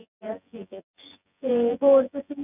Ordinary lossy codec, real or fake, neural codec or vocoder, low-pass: none; fake; vocoder, 24 kHz, 100 mel bands, Vocos; 3.6 kHz